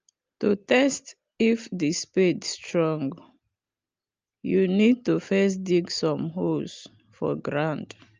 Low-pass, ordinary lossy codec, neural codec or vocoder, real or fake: 7.2 kHz; Opus, 24 kbps; none; real